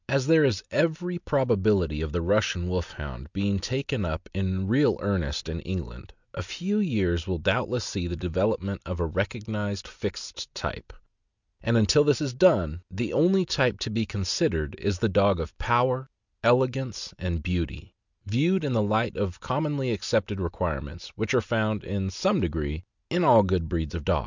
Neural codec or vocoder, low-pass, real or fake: none; 7.2 kHz; real